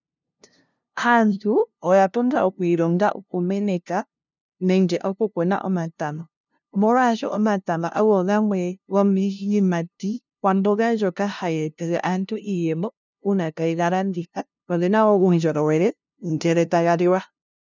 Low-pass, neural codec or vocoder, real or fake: 7.2 kHz; codec, 16 kHz, 0.5 kbps, FunCodec, trained on LibriTTS, 25 frames a second; fake